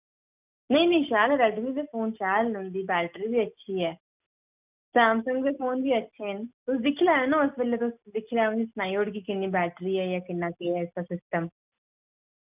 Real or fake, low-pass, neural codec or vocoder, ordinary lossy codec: real; 3.6 kHz; none; none